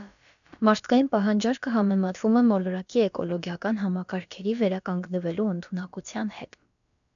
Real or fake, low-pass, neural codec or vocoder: fake; 7.2 kHz; codec, 16 kHz, about 1 kbps, DyCAST, with the encoder's durations